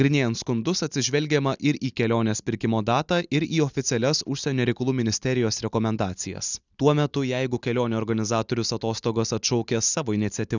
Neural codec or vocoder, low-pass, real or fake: none; 7.2 kHz; real